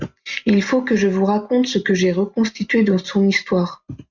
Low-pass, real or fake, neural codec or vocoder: 7.2 kHz; real; none